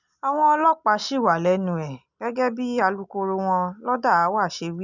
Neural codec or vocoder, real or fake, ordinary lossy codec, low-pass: none; real; none; 7.2 kHz